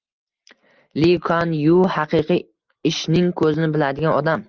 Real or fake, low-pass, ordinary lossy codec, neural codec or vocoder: real; 7.2 kHz; Opus, 32 kbps; none